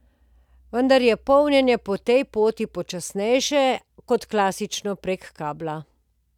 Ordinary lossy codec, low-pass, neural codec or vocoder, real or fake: none; 19.8 kHz; none; real